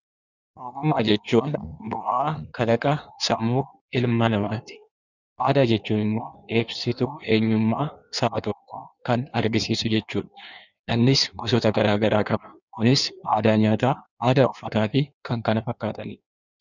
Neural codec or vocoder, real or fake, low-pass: codec, 16 kHz in and 24 kHz out, 1.1 kbps, FireRedTTS-2 codec; fake; 7.2 kHz